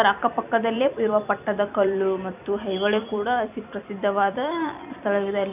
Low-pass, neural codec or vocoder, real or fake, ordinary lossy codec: 3.6 kHz; vocoder, 44.1 kHz, 128 mel bands every 256 samples, BigVGAN v2; fake; none